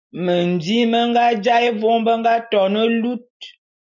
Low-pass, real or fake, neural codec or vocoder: 7.2 kHz; real; none